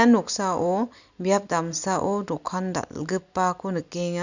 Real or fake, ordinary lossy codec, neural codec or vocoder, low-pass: real; none; none; 7.2 kHz